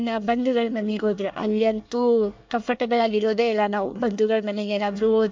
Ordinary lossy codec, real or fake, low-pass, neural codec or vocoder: MP3, 64 kbps; fake; 7.2 kHz; codec, 24 kHz, 1 kbps, SNAC